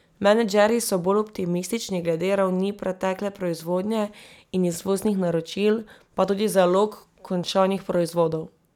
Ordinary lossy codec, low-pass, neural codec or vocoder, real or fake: none; 19.8 kHz; none; real